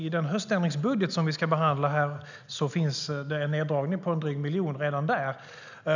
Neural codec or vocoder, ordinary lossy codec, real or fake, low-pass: none; none; real; 7.2 kHz